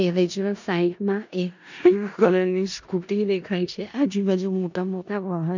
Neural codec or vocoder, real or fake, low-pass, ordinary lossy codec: codec, 16 kHz in and 24 kHz out, 0.4 kbps, LongCat-Audio-Codec, four codebook decoder; fake; 7.2 kHz; none